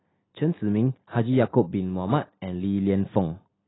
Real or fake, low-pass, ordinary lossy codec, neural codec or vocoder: real; 7.2 kHz; AAC, 16 kbps; none